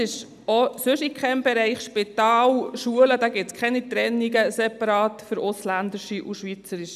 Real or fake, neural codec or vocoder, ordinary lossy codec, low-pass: real; none; none; 14.4 kHz